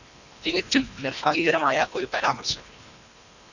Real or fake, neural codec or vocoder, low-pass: fake; codec, 24 kHz, 1.5 kbps, HILCodec; 7.2 kHz